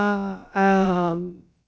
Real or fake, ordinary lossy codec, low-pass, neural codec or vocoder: fake; none; none; codec, 16 kHz, about 1 kbps, DyCAST, with the encoder's durations